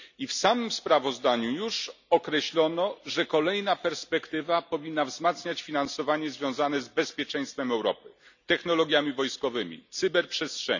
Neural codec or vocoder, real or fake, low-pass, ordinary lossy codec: none; real; 7.2 kHz; none